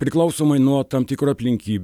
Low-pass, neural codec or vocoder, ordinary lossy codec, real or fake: 19.8 kHz; none; MP3, 96 kbps; real